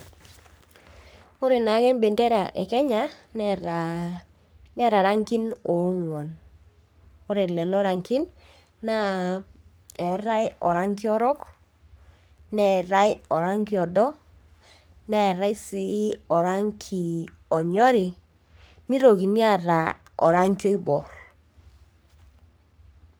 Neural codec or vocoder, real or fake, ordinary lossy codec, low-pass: codec, 44.1 kHz, 3.4 kbps, Pupu-Codec; fake; none; none